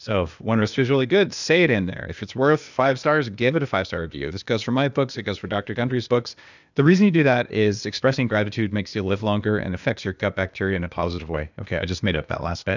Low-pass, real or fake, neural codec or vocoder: 7.2 kHz; fake; codec, 16 kHz, 0.8 kbps, ZipCodec